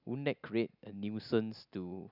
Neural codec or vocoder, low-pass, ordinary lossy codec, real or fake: none; 5.4 kHz; none; real